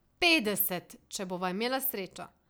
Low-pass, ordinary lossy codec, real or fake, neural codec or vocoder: none; none; real; none